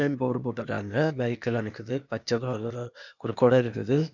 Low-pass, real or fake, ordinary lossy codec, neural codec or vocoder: 7.2 kHz; fake; none; codec, 16 kHz, 0.8 kbps, ZipCodec